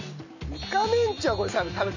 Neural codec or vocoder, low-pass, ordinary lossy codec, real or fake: none; 7.2 kHz; none; real